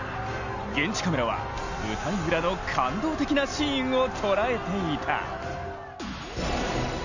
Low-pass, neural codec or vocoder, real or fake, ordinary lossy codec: 7.2 kHz; none; real; none